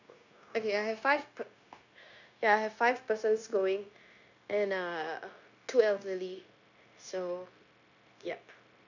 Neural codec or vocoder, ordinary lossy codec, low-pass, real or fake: codec, 16 kHz, 0.9 kbps, LongCat-Audio-Codec; none; 7.2 kHz; fake